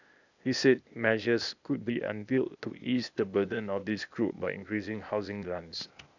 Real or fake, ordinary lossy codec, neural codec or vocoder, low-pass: fake; none; codec, 16 kHz, 0.8 kbps, ZipCodec; 7.2 kHz